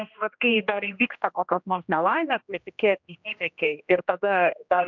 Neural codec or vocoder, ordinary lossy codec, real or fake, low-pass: codec, 16 kHz, 1 kbps, X-Codec, HuBERT features, trained on general audio; MP3, 64 kbps; fake; 7.2 kHz